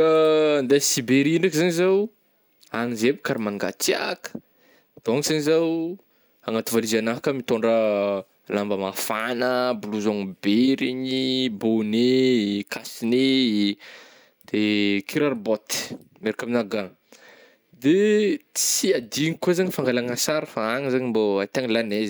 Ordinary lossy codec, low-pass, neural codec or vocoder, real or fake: none; none; none; real